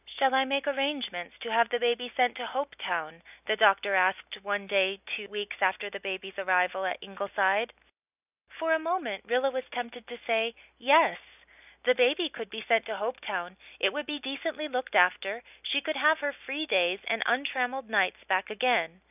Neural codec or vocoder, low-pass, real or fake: none; 3.6 kHz; real